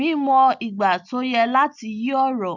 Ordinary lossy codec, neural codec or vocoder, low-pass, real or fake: none; none; 7.2 kHz; real